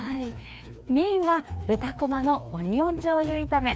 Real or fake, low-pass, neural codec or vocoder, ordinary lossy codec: fake; none; codec, 16 kHz, 2 kbps, FreqCodec, larger model; none